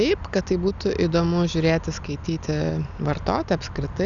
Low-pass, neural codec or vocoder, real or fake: 7.2 kHz; none; real